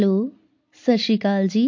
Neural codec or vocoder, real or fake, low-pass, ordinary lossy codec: none; real; 7.2 kHz; MP3, 48 kbps